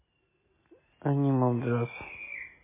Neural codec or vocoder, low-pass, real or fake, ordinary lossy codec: none; 3.6 kHz; real; MP3, 16 kbps